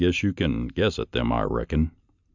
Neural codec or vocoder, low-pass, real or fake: none; 7.2 kHz; real